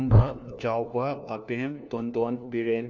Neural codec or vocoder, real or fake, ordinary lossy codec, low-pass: codec, 16 kHz, 1 kbps, FunCodec, trained on LibriTTS, 50 frames a second; fake; none; 7.2 kHz